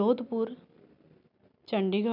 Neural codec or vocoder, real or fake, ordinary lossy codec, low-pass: none; real; none; 5.4 kHz